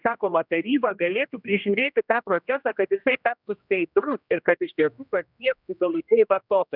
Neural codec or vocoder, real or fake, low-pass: codec, 16 kHz, 1 kbps, X-Codec, HuBERT features, trained on general audio; fake; 5.4 kHz